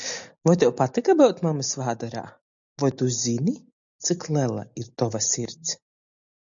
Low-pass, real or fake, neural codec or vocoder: 7.2 kHz; real; none